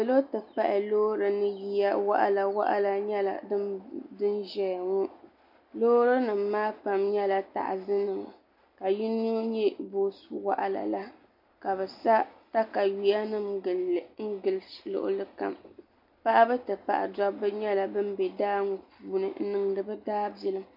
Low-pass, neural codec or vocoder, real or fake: 5.4 kHz; none; real